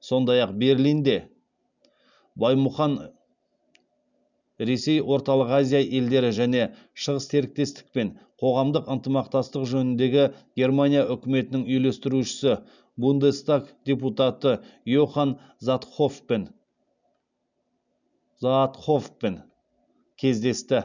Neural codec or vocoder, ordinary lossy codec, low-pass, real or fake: none; none; 7.2 kHz; real